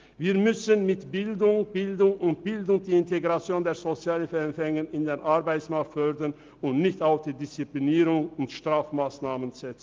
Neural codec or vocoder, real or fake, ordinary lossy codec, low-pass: none; real; Opus, 32 kbps; 7.2 kHz